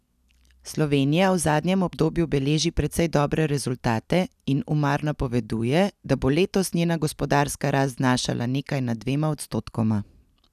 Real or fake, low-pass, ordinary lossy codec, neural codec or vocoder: real; 14.4 kHz; none; none